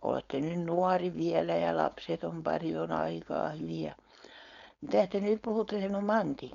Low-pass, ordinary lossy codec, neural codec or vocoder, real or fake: 7.2 kHz; none; codec, 16 kHz, 4.8 kbps, FACodec; fake